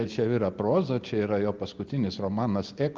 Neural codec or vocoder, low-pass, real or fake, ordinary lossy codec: none; 7.2 kHz; real; Opus, 32 kbps